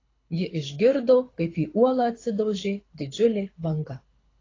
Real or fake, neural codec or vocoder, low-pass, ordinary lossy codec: fake; codec, 24 kHz, 6 kbps, HILCodec; 7.2 kHz; AAC, 32 kbps